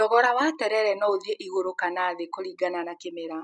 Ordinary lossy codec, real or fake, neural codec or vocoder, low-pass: none; real; none; 10.8 kHz